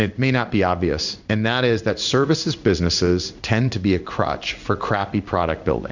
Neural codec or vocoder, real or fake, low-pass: codec, 16 kHz in and 24 kHz out, 1 kbps, XY-Tokenizer; fake; 7.2 kHz